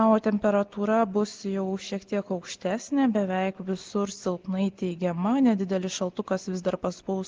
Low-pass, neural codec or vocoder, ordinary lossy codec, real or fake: 7.2 kHz; none; Opus, 16 kbps; real